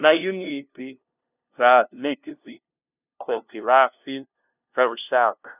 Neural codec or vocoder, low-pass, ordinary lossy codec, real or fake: codec, 16 kHz, 0.5 kbps, FunCodec, trained on LibriTTS, 25 frames a second; 3.6 kHz; none; fake